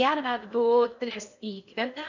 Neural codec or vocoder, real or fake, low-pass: codec, 16 kHz in and 24 kHz out, 0.6 kbps, FocalCodec, streaming, 4096 codes; fake; 7.2 kHz